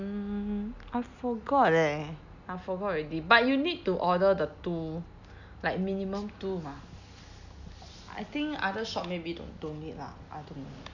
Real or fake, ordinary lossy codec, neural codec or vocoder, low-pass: real; none; none; 7.2 kHz